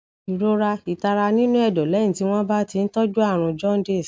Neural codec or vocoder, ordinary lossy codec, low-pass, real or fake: none; none; none; real